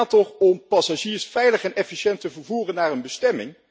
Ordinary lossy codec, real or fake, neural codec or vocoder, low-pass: none; real; none; none